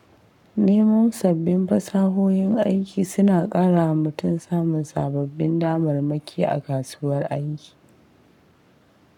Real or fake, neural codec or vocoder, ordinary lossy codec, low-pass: fake; codec, 44.1 kHz, 7.8 kbps, Pupu-Codec; none; 19.8 kHz